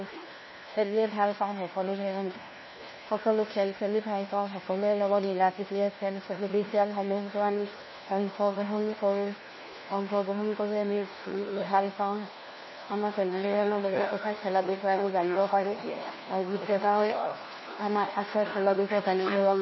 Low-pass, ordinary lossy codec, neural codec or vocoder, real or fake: 7.2 kHz; MP3, 24 kbps; codec, 16 kHz, 1 kbps, FunCodec, trained on LibriTTS, 50 frames a second; fake